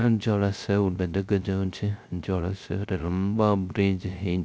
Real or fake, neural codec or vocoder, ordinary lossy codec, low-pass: fake; codec, 16 kHz, 0.3 kbps, FocalCodec; none; none